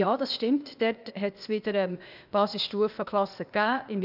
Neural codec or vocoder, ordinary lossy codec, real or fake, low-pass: codec, 16 kHz, 0.8 kbps, ZipCodec; none; fake; 5.4 kHz